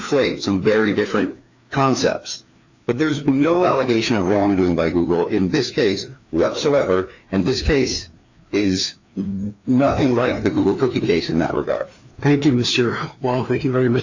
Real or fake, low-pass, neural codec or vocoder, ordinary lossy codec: fake; 7.2 kHz; codec, 16 kHz, 2 kbps, FreqCodec, larger model; AAC, 48 kbps